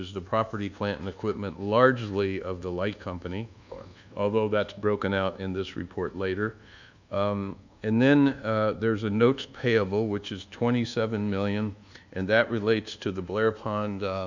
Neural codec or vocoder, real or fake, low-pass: codec, 24 kHz, 1.2 kbps, DualCodec; fake; 7.2 kHz